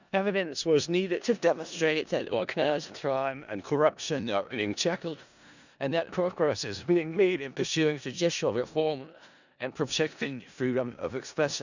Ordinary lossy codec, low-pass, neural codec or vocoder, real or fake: none; 7.2 kHz; codec, 16 kHz in and 24 kHz out, 0.4 kbps, LongCat-Audio-Codec, four codebook decoder; fake